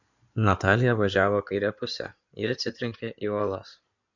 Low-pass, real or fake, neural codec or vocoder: 7.2 kHz; fake; codec, 16 kHz in and 24 kHz out, 2.2 kbps, FireRedTTS-2 codec